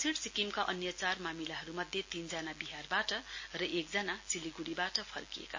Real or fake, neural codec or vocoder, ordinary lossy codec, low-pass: real; none; MP3, 32 kbps; 7.2 kHz